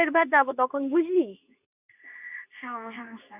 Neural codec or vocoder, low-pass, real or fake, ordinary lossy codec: codec, 24 kHz, 0.9 kbps, WavTokenizer, medium speech release version 2; 3.6 kHz; fake; none